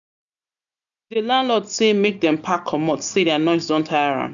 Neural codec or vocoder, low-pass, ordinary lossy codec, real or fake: none; 7.2 kHz; none; real